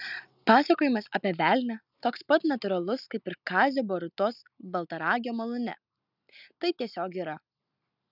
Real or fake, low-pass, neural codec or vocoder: real; 5.4 kHz; none